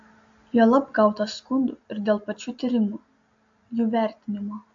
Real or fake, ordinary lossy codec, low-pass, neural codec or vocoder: real; AAC, 64 kbps; 7.2 kHz; none